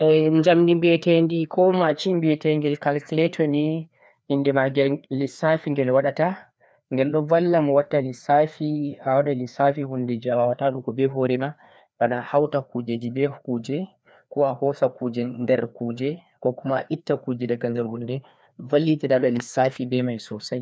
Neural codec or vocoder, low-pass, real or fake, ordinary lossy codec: codec, 16 kHz, 2 kbps, FreqCodec, larger model; none; fake; none